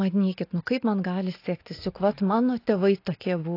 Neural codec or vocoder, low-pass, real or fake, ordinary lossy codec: none; 5.4 kHz; real; AAC, 32 kbps